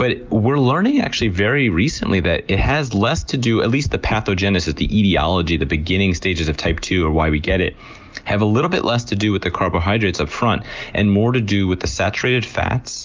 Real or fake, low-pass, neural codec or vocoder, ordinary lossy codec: real; 7.2 kHz; none; Opus, 32 kbps